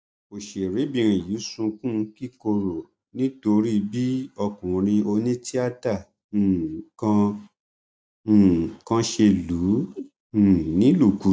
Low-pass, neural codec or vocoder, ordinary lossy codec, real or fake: none; none; none; real